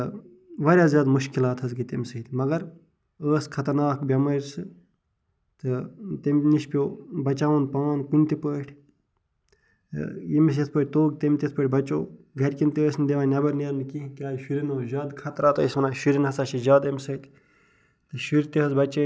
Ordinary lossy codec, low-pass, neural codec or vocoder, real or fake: none; none; none; real